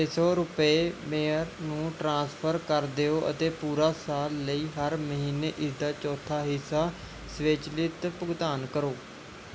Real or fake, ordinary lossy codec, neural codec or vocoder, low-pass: real; none; none; none